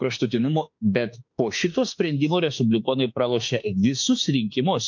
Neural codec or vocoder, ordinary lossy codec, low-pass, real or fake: autoencoder, 48 kHz, 32 numbers a frame, DAC-VAE, trained on Japanese speech; MP3, 64 kbps; 7.2 kHz; fake